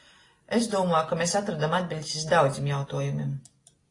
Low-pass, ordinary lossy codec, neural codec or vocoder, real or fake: 10.8 kHz; AAC, 32 kbps; none; real